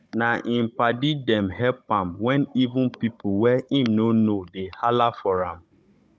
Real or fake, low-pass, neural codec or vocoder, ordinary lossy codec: fake; none; codec, 16 kHz, 16 kbps, FunCodec, trained on Chinese and English, 50 frames a second; none